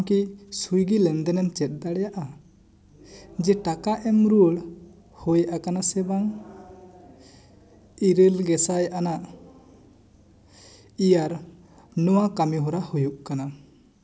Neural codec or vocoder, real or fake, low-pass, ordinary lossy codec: none; real; none; none